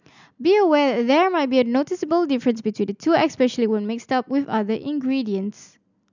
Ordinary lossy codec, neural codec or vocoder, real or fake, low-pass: none; none; real; 7.2 kHz